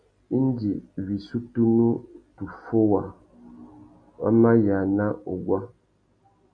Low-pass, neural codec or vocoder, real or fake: 9.9 kHz; none; real